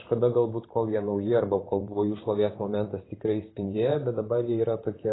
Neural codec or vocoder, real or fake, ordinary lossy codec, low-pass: vocoder, 44.1 kHz, 128 mel bands, Pupu-Vocoder; fake; AAC, 16 kbps; 7.2 kHz